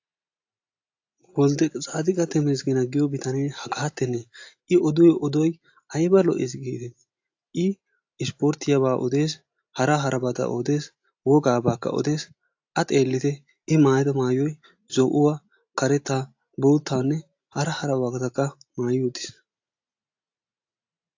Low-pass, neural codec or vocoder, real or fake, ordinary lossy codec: 7.2 kHz; none; real; AAC, 48 kbps